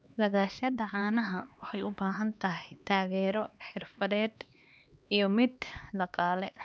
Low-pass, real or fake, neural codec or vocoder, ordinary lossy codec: none; fake; codec, 16 kHz, 2 kbps, X-Codec, HuBERT features, trained on LibriSpeech; none